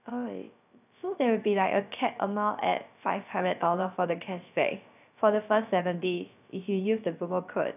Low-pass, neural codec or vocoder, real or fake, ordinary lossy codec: 3.6 kHz; codec, 16 kHz, 0.3 kbps, FocalCodec; fake; none